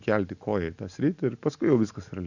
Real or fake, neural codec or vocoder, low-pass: real; none; 7.2 kHz